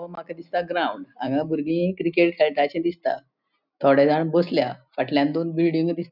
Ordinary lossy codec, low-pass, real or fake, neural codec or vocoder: none; 5.4 kHz; real; none